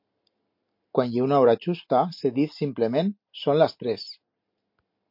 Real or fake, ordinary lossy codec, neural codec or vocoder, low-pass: real; MP3, 32 kbps; none; 5.4 kHz